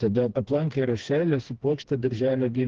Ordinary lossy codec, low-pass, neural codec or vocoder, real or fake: Opus, 16 kbps; 7.2 kHz; codec, 16 kHz, 2 kbps, FreqCodec, smaller model; fake